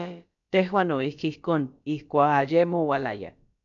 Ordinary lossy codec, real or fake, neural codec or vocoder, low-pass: AAC, 64 kbps; fake; codec, 16 kHz, about 1 kbps, DyCAST, with the encoder's durations; 7.2 kHz